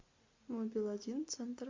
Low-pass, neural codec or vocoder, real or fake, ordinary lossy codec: 7.2 kHz; none; real; MP3, 48 kbps